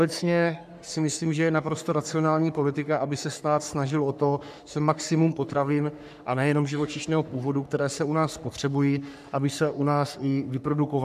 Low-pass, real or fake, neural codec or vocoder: 14.4 kHz; fake; codec, 44.1 kHz, 3.4 kbps, Pupu-Codec